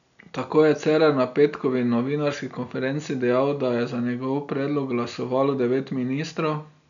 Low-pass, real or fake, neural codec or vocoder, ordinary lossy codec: 7.2 kHz; real; none; none